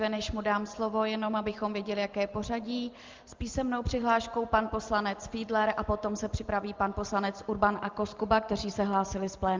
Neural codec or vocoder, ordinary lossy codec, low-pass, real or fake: none; Opus, 24 kbps; 7.2 kHz; real